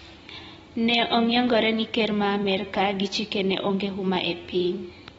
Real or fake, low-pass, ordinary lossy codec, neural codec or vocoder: real; 10.8 kHz; AAC, 24 kbps; none